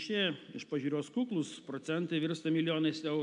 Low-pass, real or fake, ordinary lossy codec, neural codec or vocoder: 10.8 kHz; real; MP3, 64 kbps; none